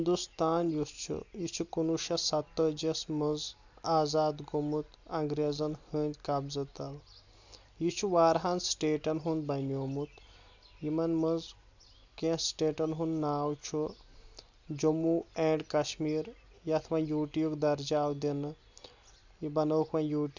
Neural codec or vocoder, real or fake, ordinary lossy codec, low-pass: none; real; none; 7.2 kHz